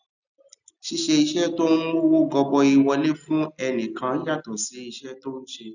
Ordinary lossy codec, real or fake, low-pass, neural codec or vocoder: none; real; 7.2 kHz; none